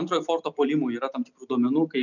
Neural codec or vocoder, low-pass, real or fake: none; 7.2 kHz; real